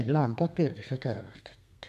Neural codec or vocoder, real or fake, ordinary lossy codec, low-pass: codec, 32 kHz, 1.9 kbps, SNAC; fake; none; 14.4 kHz